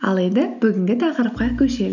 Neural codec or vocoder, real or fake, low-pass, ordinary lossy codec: none; real; 7.2 kHz; none